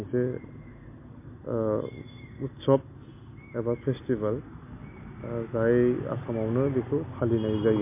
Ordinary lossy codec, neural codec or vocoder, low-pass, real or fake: MP3, 24 kbps; none; 3.6 kHz; real